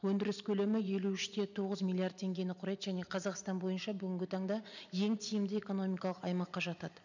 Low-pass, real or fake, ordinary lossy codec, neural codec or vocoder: 7.2 kHz; real; none; none